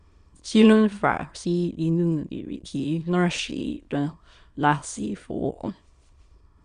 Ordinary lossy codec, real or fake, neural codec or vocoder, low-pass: Opus, 64 kbps; fake; autoencoder, 22.05 kHz, a latent of 192 numbers a frame, VITS, trained on many speakers; 9.9 kHz